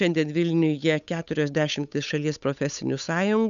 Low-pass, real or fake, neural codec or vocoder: 7.2 kHz; fake; codec, 16 kHz, 8 kbps, FunCodec, trained on Chinese and English, 25 frames a second